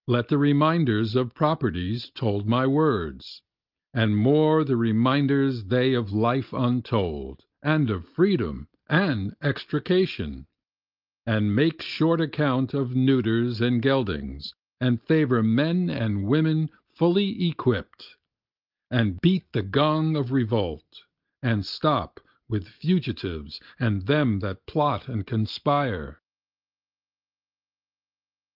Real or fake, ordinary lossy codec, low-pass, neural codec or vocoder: real; Opus, 24 kbps; 5.4 kHz; none